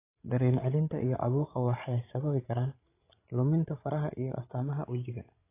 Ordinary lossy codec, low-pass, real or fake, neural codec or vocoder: AAC, 24 kbps; 3.6 kHz; fake; codec, 16 kHz, 16 kbps, FreqCodec, larger model